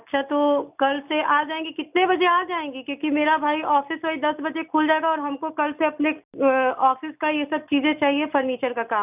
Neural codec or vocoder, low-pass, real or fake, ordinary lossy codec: none; 3.6 kHz; real; none